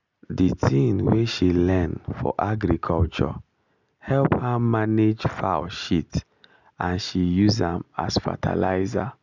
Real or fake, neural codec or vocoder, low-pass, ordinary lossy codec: real; none; 7.2 kHz; none